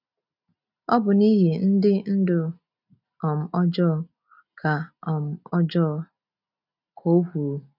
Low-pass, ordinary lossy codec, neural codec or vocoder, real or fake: 5.4 kHz; none; none; real